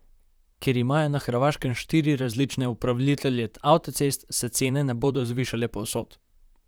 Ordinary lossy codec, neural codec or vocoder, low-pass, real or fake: none; vocoder, 44.1 kHz, 128 mel bands, Pupu-Vocoder; none; fake